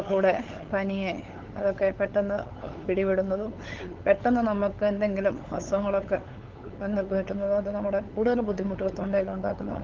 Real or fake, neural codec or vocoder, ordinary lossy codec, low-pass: fake; codec, 16 kHz, 8 kbps, FunCodec, trained on LibriTTS, 25 frames a second; Opus, 16 kbps; 7.2 kHz